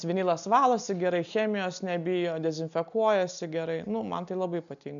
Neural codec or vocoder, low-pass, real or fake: none; 7.2 kHz; real